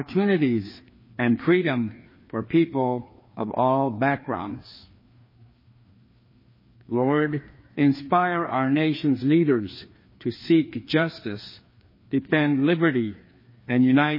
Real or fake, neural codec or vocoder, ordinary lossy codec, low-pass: fake; codec, 16 kHz, 2 kbps, FreqCodec, larger model; MP3, 24 kbps; 5.4 kHz